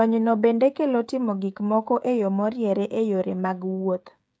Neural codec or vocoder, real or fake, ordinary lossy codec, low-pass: codec, 16 kHz, 16 kbps, FreqCodec, smaller model; fake; none; none